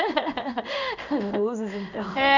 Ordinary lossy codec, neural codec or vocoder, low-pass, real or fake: none; codec, 16 kHz in and 24 kHz out, 1 kbps, XY-Tokenizer; 7.2 kHz; fake